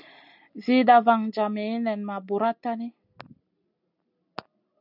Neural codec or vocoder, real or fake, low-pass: none; real; 5.4 kHz